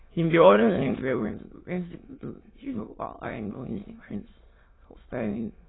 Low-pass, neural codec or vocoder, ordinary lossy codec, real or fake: 7.2 kHz; autoencoder, 22.05 kHz, a latent of 192 numbers a frame, VITS, trained on many speakers; AAC, 16 kbps; fake